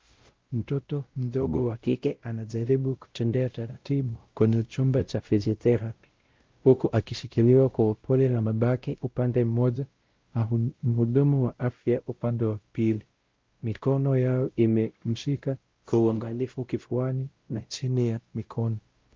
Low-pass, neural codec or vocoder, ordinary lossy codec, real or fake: 7.2 kHz; codec, 16 kHz, 0.5 kbps, X-Codec, WavLM features, trained on Multilingual LibriSpeech; Opus, 32 kbps; fake